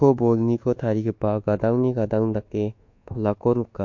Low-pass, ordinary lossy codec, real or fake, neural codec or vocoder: 7.2 kHz; MP3, 48 kbps; fake; codec, 24 kHz, 1.2 kbps, DualCodec